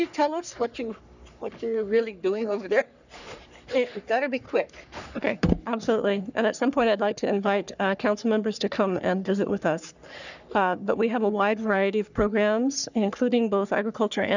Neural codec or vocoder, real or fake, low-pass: codec, 44.1 kHz, 3.4 kbps, Pupu-Codec; fake; 7.2 kHz